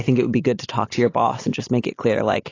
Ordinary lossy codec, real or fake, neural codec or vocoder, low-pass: AAC, 32 kbps; real; none; 7.2 kHz